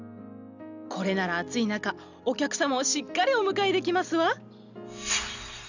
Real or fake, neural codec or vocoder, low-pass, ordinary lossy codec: real; none; 7.2 kHz; none